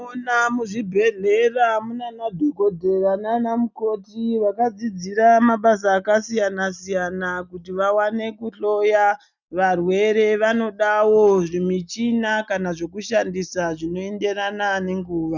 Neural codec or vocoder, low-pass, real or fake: none; 7.2 kHz; real